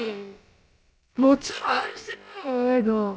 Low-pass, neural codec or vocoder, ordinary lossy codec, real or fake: none; codec, 16 kHz, about 1 kbps, DyCAST, with the encoder's durations; none; fake